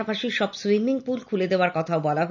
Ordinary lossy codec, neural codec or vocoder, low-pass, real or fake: none; none; 7.2 kHz; real